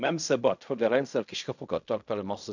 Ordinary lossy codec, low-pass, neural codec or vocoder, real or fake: none; 7.2 kHz; codec, 16 kHz in and 24 kHz out, 0.4 kbps, LongCat-Audio-Codec, fine tuned four codebook decoder; fake